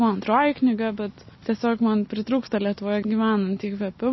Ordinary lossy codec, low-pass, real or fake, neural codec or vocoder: MP3, 24 kbps; 7.2 kHz; real; none